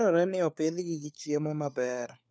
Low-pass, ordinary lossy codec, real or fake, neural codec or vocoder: none; none; fake; codec, 16 kHz, 4 kbps, FunCodec, trained on LibriTTS, 50 frames a second